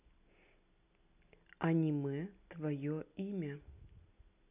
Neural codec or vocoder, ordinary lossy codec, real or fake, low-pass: none; none; real; 3.6 kHz